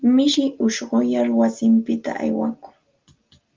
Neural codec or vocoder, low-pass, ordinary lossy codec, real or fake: none; 7.2 kHz; Opus, 32 kbps; real